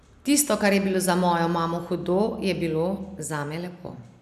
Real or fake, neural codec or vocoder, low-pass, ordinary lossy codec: real; none; 14.4 kHz; none